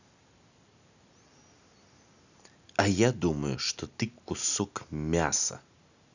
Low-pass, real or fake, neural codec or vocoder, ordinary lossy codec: 7.2 kHz; real; none; none